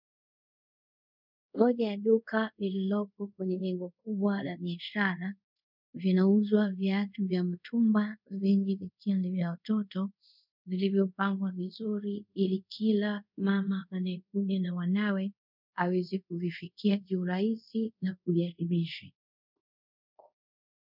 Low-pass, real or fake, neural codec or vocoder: 5.4 kHz; fake; codec, 24 kHz, 0.5 kbps, DualCodec